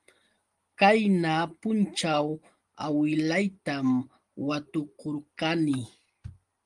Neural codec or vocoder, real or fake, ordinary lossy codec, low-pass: none; real; Opus, 24 kbps; 10.8 kHz